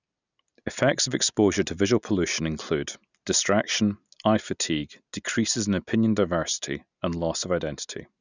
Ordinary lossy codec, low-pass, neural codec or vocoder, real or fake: none; 7.2 kHz; none; real